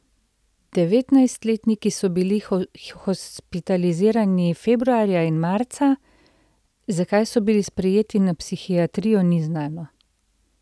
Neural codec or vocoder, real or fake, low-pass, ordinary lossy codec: none; real; none; none